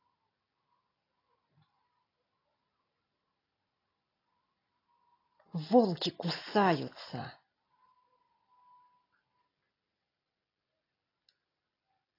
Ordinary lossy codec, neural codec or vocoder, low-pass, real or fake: AAC, 24 kbps; none; 5.4 kHz; real